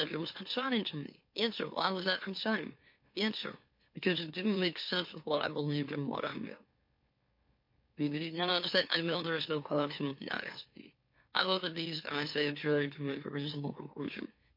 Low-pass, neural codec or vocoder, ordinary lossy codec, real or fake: 5.4 kHz; autoencoder, 44.1 kHz, a latent of 192 numbers a frame, MeloTTS; MP3, 32 kbps; fake